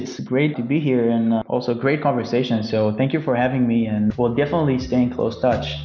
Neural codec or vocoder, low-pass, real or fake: none; 7.2 kHz; real